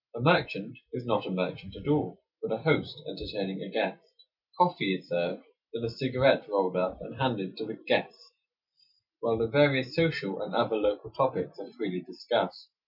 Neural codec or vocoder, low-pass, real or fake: none; 5.4 kHz; real